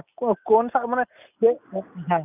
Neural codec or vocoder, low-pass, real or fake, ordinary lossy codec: none; 3.6 kHz; real; none